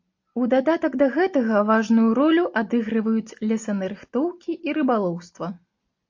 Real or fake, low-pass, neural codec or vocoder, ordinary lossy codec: real; 7.2 kHz; none; AAC, 48 kbps